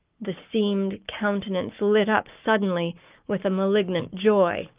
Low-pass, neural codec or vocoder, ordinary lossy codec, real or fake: 3.6 kHz; codec, 44.1 kHz, 7.8 kbps, Pupu-Codec; Opus, 24 kbps; fake